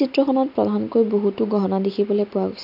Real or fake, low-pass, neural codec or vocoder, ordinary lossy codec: real; 5.4 kHz; none; none